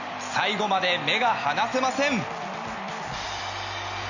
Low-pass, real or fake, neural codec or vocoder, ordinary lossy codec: 7.2 kHz; real; none; none